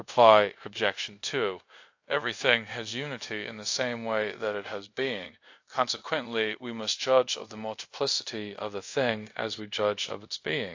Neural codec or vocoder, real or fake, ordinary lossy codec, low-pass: codec, 24 kHz, 0.5 kbps, DualCodec; fake; AAC, 48 kbps; 7.2 kHz